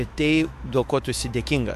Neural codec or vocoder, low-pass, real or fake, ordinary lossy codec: autoencoder, 48 kHz, 128 numbers a frame, DAC-VAE, trained on Japanese speech; 14.4 kHz; fake; MP3, 96 kbps